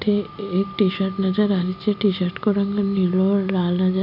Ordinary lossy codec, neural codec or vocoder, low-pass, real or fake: none; none; 5.4 kHz; real